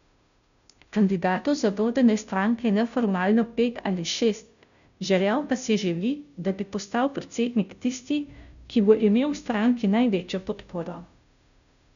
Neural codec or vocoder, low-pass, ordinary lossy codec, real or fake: codec, 16 kHz, 0.5 kbps, FunCodec, trained on Chinese and English, 25 frames a second; 7.2 kHz; none; fake